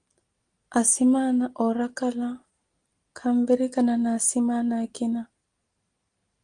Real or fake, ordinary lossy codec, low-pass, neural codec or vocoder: real; Opus, 24 kbps; 9.9 kHz; none